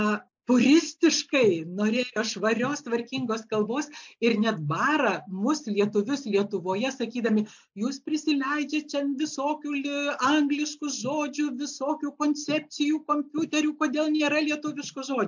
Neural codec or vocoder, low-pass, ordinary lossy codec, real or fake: none; 7.2 kHz; MP3, 64 kbps; real